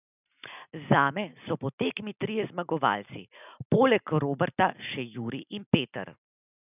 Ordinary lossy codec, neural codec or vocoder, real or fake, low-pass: none; none; real; 3.6 kHz